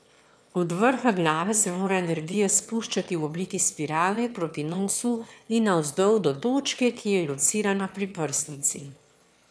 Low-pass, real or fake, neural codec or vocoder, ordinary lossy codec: none; fake; autoencoder, 22.05 kHz, a latent of 192 numbers a frame, VITS, trained on one speaker; none